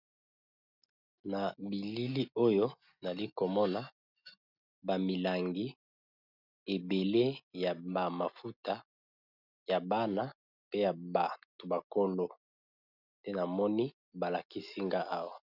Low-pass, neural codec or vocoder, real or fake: 5.4 kHz; none; real